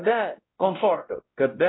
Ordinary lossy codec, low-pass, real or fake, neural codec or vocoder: AAC, 16 kbps; 7.2 kHz; fake; codec, 16 kHz, 0.5 kbps, X-Codec, WavLM features, trained on Multilingual LibriSpeech